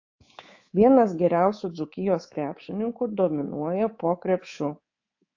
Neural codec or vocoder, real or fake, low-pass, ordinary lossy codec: codec, 24 kHz, 3.1 kbps, DualCodec; fake; 7.2 kHz; AAC, 48 kbps